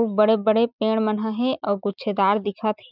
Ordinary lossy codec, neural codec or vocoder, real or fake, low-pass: none; none; real; 5.4 kHz